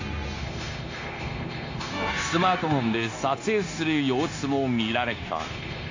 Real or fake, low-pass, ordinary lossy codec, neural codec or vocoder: fake; 7.2 kHz; AAC, 48 kbps; codec, 16 kHz, 0.9 kbps, LongCat-Audio-Codec